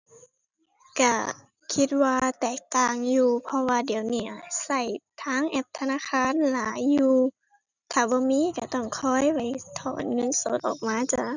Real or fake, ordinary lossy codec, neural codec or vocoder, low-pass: real; none; none; 7.2 kHz